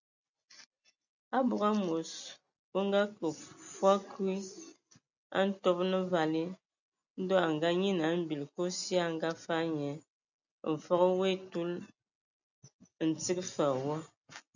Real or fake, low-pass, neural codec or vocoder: real; 7.2 kHz; none